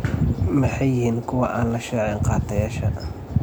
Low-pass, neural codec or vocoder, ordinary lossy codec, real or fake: none; none; none; real